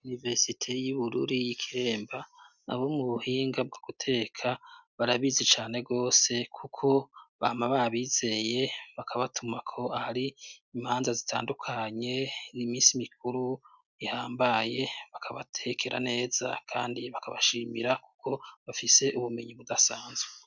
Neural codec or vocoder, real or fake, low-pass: none; real; 7.2 kHz